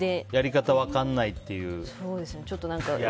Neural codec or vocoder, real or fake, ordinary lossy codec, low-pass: none; real; none; none